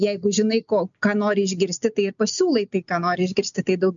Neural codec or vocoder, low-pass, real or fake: none; 7.2 kHz; real